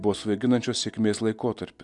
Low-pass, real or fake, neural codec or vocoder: 10.8 kHz; real; none